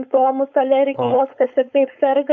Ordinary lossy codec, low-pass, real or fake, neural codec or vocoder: MP3, 96 kbps; 7.2 kHz; fake; codec, 16 kHz, 4.8 kbps, FACodec